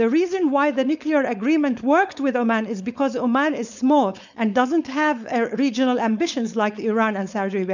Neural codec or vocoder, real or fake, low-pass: codec, 16 kHz, 4.8 kbps, FACodec; fake; 7.2 kHz